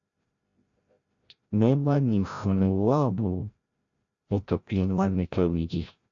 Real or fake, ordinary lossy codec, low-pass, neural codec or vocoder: fake; AAC, 64 kbps; 7.2 kHz; codec, 16 kHz, 0.5 kbps, FreqCodec, larger model